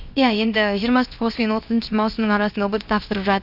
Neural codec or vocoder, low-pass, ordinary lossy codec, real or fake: codec, 16 kHz, 0.7 kbps, FocalCodec; 5.4 kHz; none; fake